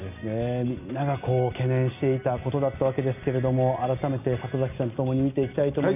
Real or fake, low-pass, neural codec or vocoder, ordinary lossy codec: real; 3.6 kHz; none; none